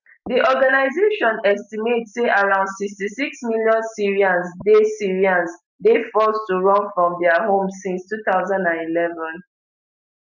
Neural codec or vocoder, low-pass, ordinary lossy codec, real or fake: none; 7.2 kHz; none; real